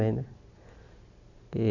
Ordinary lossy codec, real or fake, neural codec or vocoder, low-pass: none; real; none; 7.2 kHz